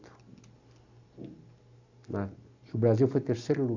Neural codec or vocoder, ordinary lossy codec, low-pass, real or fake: none; AAC, 48 kbps; 7.2 kHz; real